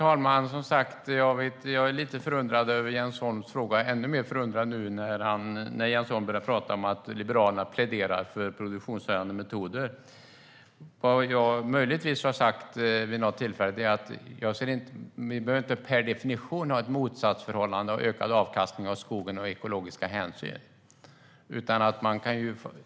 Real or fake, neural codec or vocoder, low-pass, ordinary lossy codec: real; none; none; none